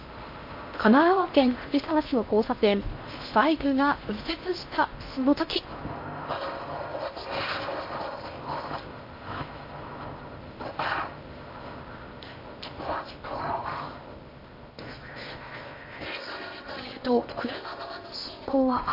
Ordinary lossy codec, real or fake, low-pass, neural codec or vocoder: MP3, 32 kbps; fake; 5.4 kHz; codec, 16 kHz in and 24 kHz out, 0.6 kbps, FocalCodec, streaming, 4096 codes